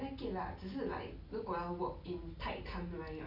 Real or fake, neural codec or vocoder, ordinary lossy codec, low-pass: real; none; none; 5.4 kHz